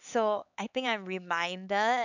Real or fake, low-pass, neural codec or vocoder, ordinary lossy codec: fake; 7.2 kHz; codec, 16 kHz, 4 kbps, X-Codec, HuBERT features, trained on LibriSpeech; none